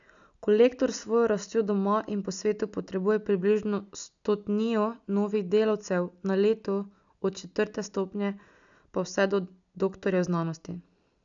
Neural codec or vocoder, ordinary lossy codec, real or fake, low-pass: none; none; real; 7.2 kHz